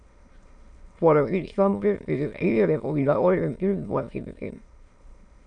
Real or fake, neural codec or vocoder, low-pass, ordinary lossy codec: fake; autoencoder, 22.05 kHz, a latent of 192 numbers a frame, VITS, trained on many speakers; 9.9 kHz; AAC, 64 kbps